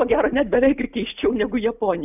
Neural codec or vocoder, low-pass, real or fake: none; 3.6 kHz; real